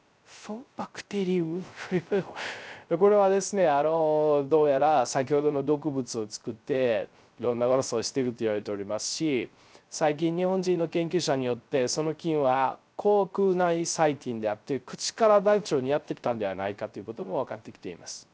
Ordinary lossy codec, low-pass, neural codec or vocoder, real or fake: none; none; codec, 16 kHz, 0.3 kbps, FocalCodec; fake